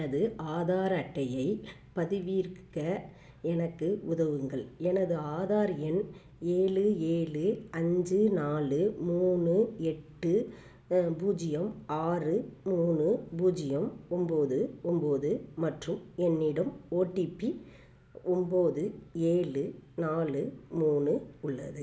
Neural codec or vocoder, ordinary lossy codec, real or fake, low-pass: none; none; real; none